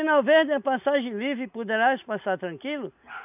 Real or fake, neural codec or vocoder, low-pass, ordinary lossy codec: real; none; 3.6 kHz; none